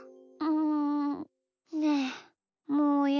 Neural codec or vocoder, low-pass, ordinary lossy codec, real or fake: none; 7.2 kHz; none; real